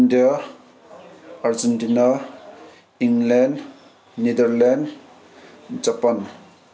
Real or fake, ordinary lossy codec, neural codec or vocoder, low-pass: real; none; none; none